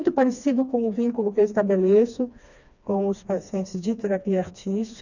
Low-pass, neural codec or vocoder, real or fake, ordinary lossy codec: 7.2 kHz; codec, 16 kHz, 2 kbps, FreqCodec, smaller model; fake; none